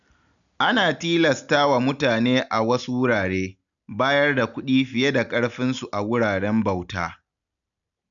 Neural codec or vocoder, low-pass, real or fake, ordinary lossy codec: none; 7.2 kHz; real; none